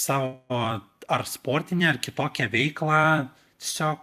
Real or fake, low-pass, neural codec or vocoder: fake; 14.4 kHz; vocoder, 44.1 kHz, 128 mel bands every 256 samples, BigVGAN v2